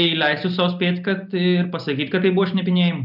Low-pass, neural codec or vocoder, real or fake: 5.4 kHz; none; real